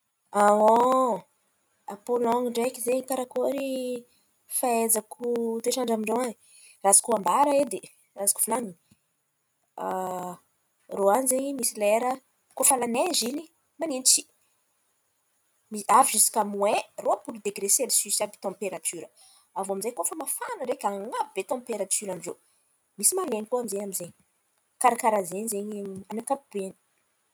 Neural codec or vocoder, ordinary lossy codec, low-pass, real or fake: none; none; none; real